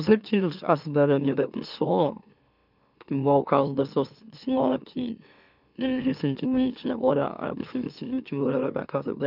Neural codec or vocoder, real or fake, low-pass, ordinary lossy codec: autoencoder, 44.1 kHz, a latent of 192 numbers a frame, MeloTTS; fake; 5.4 kHz; none